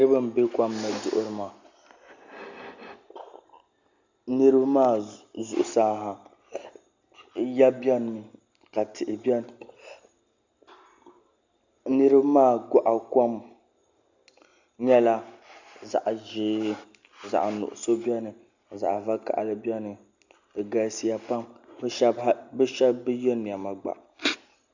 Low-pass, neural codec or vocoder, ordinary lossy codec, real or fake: 7.2 kHz; none; Opus, 64 kbps; real